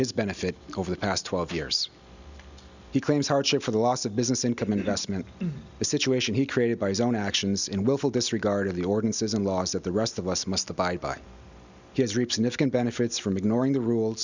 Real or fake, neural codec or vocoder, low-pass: real; none; 7.2 kHz